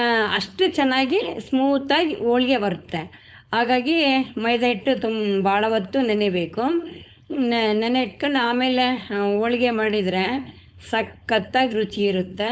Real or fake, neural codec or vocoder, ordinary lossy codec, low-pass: fake; codec, 16 kHz, 4.8 kbps, FACodec; none; none